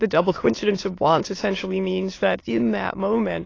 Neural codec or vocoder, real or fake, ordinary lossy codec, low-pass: autoencoder, 22.05 kHz, a latent of 192 numbers a frame, VITS, trained on many speakers; fake; AAC, 32 kbps; 7.2 kHz